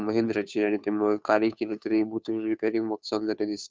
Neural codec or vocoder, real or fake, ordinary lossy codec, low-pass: codec, 16 kHz, 2 kbps, FunCodec, trained on Chinese and English, 25 frames a second; fake; none; none